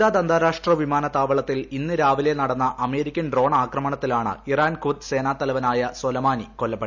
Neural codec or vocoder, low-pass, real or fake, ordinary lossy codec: none; 7.2 kHz; real; none